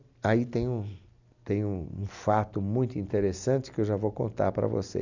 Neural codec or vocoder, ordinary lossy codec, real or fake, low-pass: none; none; real; 7.2 kHz